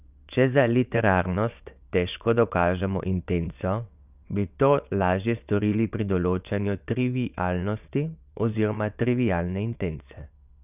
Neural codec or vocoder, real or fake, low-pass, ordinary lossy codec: vocoder, 22.05 kHz, 80 mel bands, WaveNeXt; fake; 3.6 kHz; none